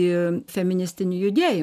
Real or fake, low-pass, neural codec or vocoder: real; 14.4 kHz; none